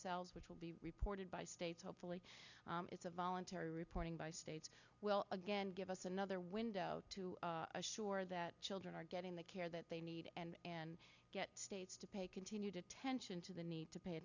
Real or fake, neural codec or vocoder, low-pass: real; none; 7.2 kHz